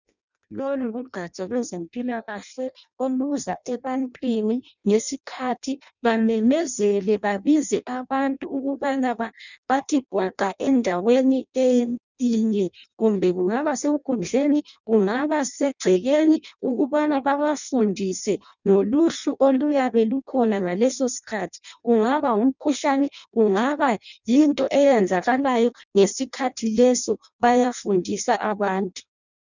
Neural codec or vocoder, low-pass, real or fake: codec, 16 kHz in and 24 kHz out, 0.6 kbps, FireRedTTS-2 codec; 7.2 kHz; fake